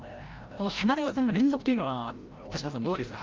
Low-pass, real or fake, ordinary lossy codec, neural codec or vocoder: 7.2 kHz; fake; Opus, 24 kbps; codec, 16 kHz, 0.5 kbps, FreqCodec, larger model